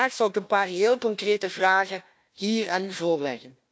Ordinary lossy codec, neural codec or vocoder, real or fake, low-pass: none; codec, 16 kHz, 1 kbps, FunCodec, trained on Chinese and English, 50 frames a second; fake; none